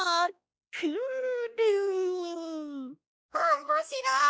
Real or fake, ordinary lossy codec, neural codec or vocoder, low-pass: fake; none; codec, 16 kHz, 1 kbps, X-Codec, WavLM features, trained on Multilingual LibriSpeech; none